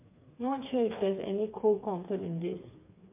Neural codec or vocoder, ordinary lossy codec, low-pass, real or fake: codec, 16 kHz, 4 kbps, FreqCodec, smaller model; MP3, 32 kbps; 3.6 kHz; fake